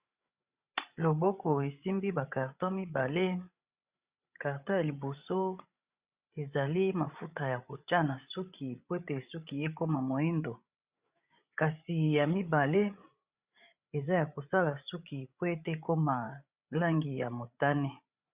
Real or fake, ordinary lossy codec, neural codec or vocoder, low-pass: fake; Opus, 64 kbps; codec, 16 kHz, 8 kbps, FreqCodec, larger model; 3.6 kHz